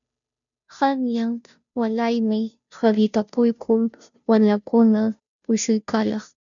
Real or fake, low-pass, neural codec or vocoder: fake; 7.2 kHz; codec, 16 kHz, 0.5 kbps, FunCodec, trained on Chinese and English, 25 frames a second